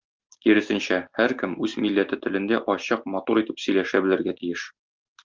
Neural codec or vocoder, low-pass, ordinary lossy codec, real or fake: none; 7.2 kHz; Opus, 24 kbps; real